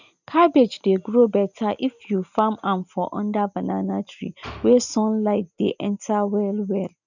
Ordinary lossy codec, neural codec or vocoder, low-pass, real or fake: none; none; 7.2 kHz; real